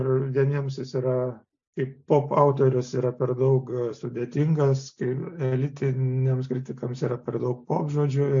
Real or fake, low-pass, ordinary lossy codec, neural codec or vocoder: real; 7.2 kHz; AAC, 64 kbps; none